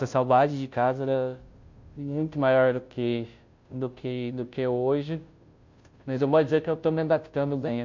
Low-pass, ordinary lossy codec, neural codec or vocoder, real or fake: 7.2 kHz; MP3, 48 kbps; codec, 16 kHz, 0.5 kbps, FunCodec, trained on Chinese and English, 25 frames a second; fake